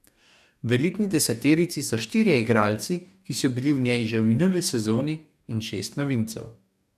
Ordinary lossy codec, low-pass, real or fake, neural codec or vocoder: none; 14.4 kHz; fake; codec, 44.1 kHz, 2.6 kbps, DAC